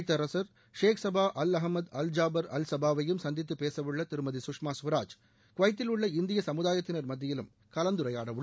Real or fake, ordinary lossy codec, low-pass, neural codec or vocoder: real; none; none; none